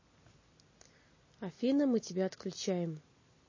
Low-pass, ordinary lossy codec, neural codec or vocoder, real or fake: 7.2 kHz; MP3, 32 kbps; none; real